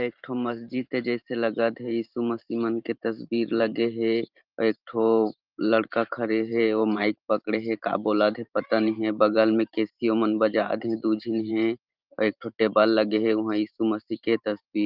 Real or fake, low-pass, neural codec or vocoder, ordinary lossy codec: fake; 5.4 kHz; vocoder, 44.1 kHz, 128 mel bands every 512 samples, BigVGAN v2; Opus, 32 kbps